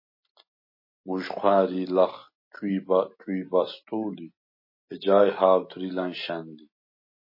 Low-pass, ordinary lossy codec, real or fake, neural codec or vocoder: 5.4 kHz; MP3, 24 kbps; real; none